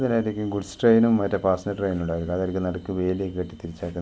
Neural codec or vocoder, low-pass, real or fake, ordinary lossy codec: none; none; real; none